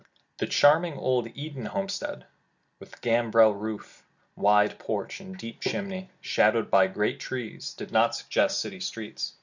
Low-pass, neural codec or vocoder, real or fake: 7.2 kHz; none; real